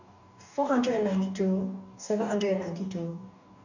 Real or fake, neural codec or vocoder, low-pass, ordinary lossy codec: fake; codec, 44.1 kHz, 2.6 kbps, DAC; 7.2 kHz; none